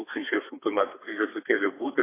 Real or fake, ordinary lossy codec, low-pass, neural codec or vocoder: fake; AAC, 16 kbps; 3.6 kHz; codec, 32 kHz, 1.9 kbps, SNAC